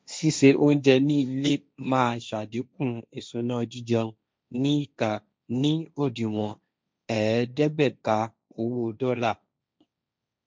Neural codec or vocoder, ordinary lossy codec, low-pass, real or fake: codec, 16 kHz, 1.1 kbps, Voila-Tokenizer; none; none; fake